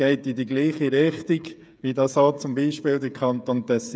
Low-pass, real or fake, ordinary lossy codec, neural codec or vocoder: none; fake; none; codec, 16 kHz, 8 kbps, FreqCodec, smaller model